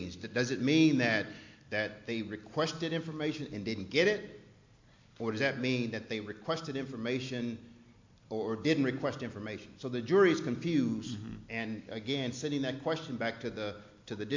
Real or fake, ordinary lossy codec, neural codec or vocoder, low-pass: real; MP3, 48 kbps; none; 7.2 kHz